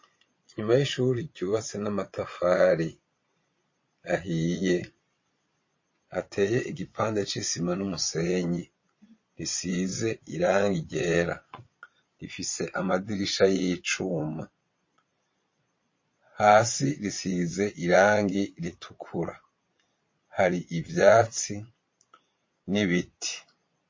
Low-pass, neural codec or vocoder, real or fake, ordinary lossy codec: 7.2 kHz; vocoder, 22.05 kHz, 80 mel bands, Vocos; fake; MP3, 32 kbps